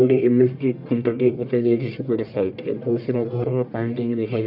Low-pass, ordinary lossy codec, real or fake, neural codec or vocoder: 5.4 kHz; none; fake; codec, 44.1 kHz, 1.7 kbps, Pupu-Codec